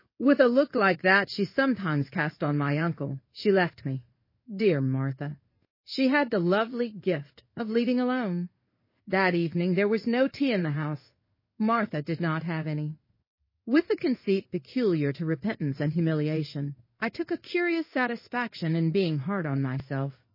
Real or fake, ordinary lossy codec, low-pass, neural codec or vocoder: fake; MP3, 24 kbps; 5.4 kHz; codec, 16 kHz in and 24 kHz out, 1 kbps, XY-Tokenizer